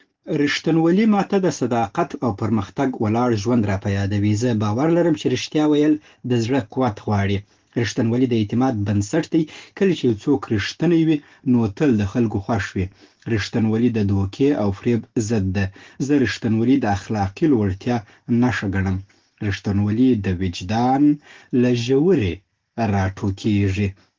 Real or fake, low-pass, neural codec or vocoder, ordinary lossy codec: real; 7.2 kHz; none; Opus, 16 kbps